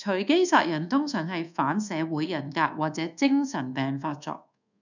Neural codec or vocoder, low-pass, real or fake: codec, 24 kHz, 1.2 kbps, DualCodec; 7.2 kHz; fake